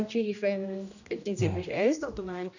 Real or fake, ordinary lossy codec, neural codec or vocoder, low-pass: fake; none; codec, 16 kHz, 1 kbps, X-Codec, HuBERT features, trained on general audio; 7.2 kHz